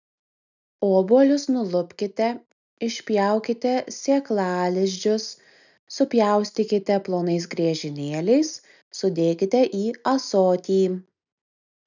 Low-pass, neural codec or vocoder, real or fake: 7.2 kHz; none; real